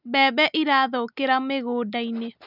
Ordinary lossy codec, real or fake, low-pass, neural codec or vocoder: none; real; 5.4 kHz; none